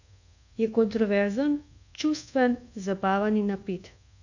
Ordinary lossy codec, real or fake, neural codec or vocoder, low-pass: none; fake; codec, 24 kHz, 1.2 kbps, DualCodec; 7.2 kHz